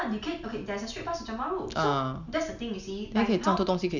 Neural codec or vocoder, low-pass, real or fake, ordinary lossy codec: none; 7.2 kHz; real; none